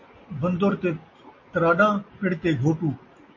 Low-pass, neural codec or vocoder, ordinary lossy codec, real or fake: 7.2 kHz; none; MP3, 32 kbps; real